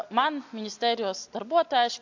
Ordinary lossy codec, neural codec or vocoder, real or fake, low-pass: AAC, 48 kbps; none; real; 7.2 kHz